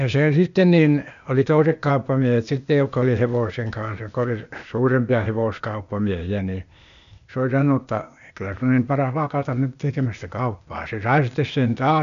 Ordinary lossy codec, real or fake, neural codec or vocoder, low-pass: none; fake; codec, 16 kHz, 0.8 kbps, ZipCodec; 7.2 kHz